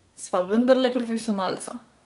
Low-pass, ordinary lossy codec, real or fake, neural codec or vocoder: 10.8 kHz; none; fake; codec, 24 kHz, 1 kbps, SNAC